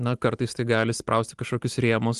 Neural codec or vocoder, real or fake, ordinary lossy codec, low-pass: none; real; Opus, 24 kbps; 10.8 kHz